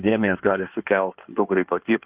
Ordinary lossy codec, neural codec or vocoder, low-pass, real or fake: Opus, 32 kbps; codec, 16 kHz in and 24 kHz out, 1.1 kbps, FireRedTTS-2 codec; 3.6 kHz; fake